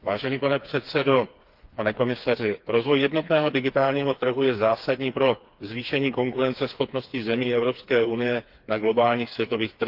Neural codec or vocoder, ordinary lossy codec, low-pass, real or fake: codec, 16 kHz, 4 kbps, FreqCodec, smaller model; Opus, 32 kbps; 5.4 kHz; fake